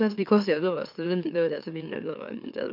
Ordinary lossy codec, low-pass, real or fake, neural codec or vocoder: none; 5.4 kHz; fake; autoencoder, 44.1 kHz, a latent of 192 numbers a frame, MeloTTS